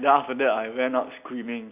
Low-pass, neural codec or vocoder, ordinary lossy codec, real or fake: 3.6 kHz; none; none; real